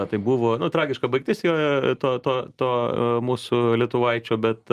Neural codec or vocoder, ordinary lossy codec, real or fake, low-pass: none; Opus, 32 kbps; real; 14.4 kHz